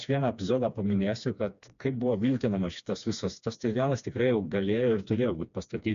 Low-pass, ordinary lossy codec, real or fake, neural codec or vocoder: 7.2 kHz; MP3, 64 kbps; fake; codec, 16 kHz, 2 kbps, FreqCodec, smaller model